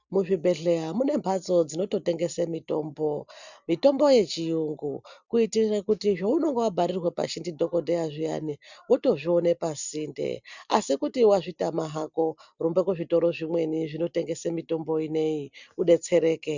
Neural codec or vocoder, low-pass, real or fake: none; 7.2 kHz; real